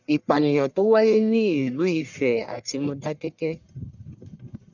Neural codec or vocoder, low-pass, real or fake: codec, 44.1 kHz, 1.7 kbps, Pupu-Codec; 7.2 kHz; fake